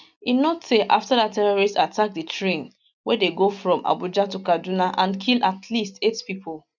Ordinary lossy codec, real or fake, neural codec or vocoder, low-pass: none; real; none; 7.2 kHz